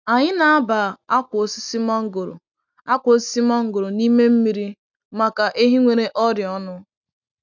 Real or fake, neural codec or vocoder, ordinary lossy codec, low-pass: real; none; none; 7.2 kHz